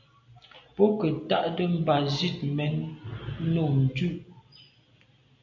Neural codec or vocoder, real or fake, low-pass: none; real; 7.2 kHz